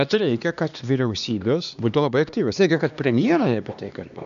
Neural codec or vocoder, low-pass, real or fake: codec, 16 kHz, 2 kbps, X-Codec, HuBERT features, trained on LibriSpeech; 7.2 kHz; fake